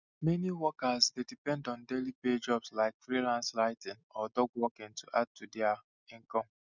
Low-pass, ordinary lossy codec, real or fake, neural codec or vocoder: 7.2 kHz; none; real; none